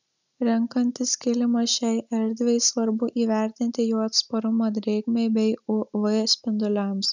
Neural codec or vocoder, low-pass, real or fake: none; 7.2 kHz; real